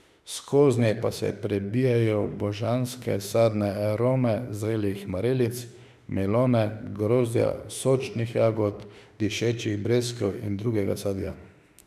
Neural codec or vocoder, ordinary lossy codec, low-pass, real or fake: autoencoder, 48 kHz, 32 numbers a frame, DAC-VAE, trained on Japanese speech; none; 14.4 kHz; fake